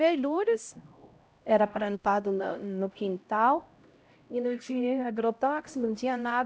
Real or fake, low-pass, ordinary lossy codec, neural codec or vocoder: fake; none; none; codec, 16 kHz, 0.5 kbps, X-Codec, HuBERT features, trained on LibriSpeech